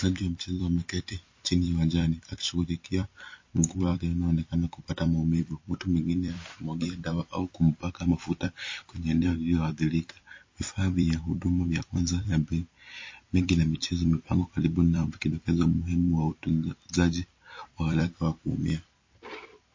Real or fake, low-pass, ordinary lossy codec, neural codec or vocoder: fake; 7.2 kHz; MP3, 32 kbps; vocoder, 44.1 kHz, 128 mel bands every 256 samples, BigVGAN v2